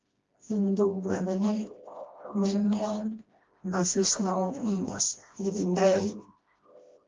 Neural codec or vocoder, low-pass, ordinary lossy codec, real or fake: codec, 16 kHz, 1 kbps, FreqCodec, smaller model; 7.2 kHz; Opus, 32 kbps; fake